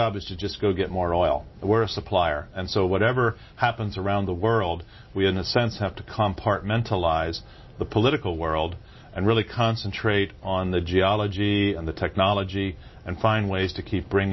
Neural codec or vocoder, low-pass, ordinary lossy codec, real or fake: none; 7.2 kHz; MP3, 24 kbps; real